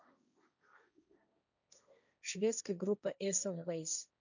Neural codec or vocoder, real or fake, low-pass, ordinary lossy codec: codec, 16 kHz, 1.1 kbps, Voila-Tokenizer; fake; 7.2 kHz; none